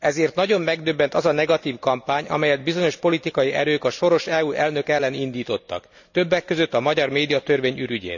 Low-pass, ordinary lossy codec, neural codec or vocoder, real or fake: 7.2 kHz; none; none; real